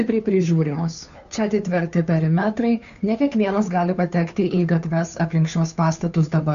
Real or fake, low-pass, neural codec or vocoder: fake; 7.2 kHz; codec, 16 kHz, 2 kbps, FunCodec, trained on Chinese and English, 25 frames a second